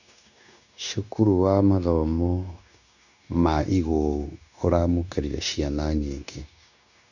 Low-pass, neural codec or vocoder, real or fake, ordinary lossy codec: 7.2 kHz; codec, 16 kHz, 0.9 kbps, LongCat-Audio-Codec; fake; none